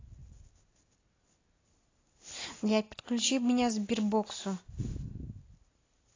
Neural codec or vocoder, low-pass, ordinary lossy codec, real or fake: none; 7.2 kHz; AAC, 32 kbps; real